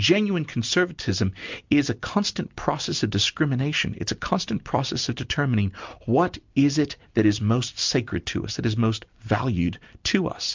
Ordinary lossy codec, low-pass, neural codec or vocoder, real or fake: MP3, 64 kbps; 7.2 kHz; none; real